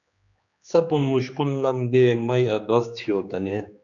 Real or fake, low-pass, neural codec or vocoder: fake; 7.2 kHz; codec, 16 kHz, 2 kbps, X-Codec, HuBERT features, trained on general audio